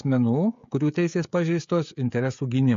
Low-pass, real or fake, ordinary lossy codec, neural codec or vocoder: 7.2 kHz; fake; MP3, 48 kbps; codec, 16 kHz, 16 kbps, FreqCodec, smaller model